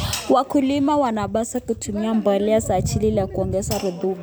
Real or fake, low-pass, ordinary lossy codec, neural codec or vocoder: real; none; none; none